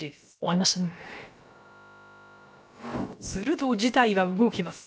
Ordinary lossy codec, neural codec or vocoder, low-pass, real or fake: none; codec, 16 kHz, about 1 kbps, DyCAST, with the encoder's durations; none; fake